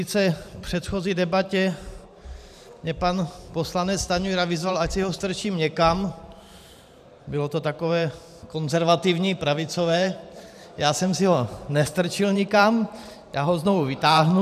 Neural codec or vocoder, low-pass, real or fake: vocoder, 44.1 kHz, 128 mel bands every 512 samples, BigVGAN v2; 14.4 kHz; fake